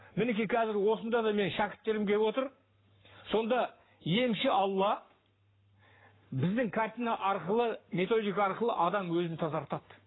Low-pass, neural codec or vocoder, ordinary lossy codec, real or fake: 7.2 kHz; codec, 44.1 kHz, 7.8 kbps, Pupu-Codec; AAC, 16 kbps; fake